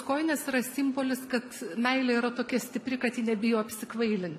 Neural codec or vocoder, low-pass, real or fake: none; 14.4 kHz; real